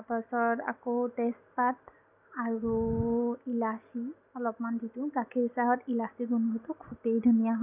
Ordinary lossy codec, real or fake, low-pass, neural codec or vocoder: none; real; 3.6 kHz; none